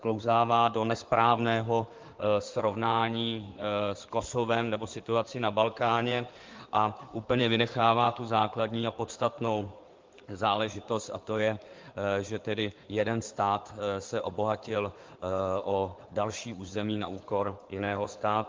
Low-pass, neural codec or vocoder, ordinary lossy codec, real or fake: 7.2 kHz; codec, 16 kHz in and 24 kHz out, 2.2 kbps, FireRedTTS-2 codec; Opus, 32 kbps; fake